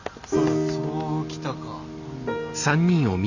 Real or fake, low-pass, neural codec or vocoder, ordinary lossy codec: real; 7.2 kHz; none; none